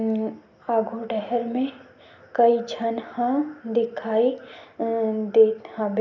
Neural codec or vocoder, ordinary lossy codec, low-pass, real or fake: none; none; 7.2 kHz; real